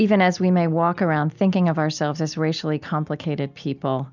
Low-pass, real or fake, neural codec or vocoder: 7.2 kHz; real; none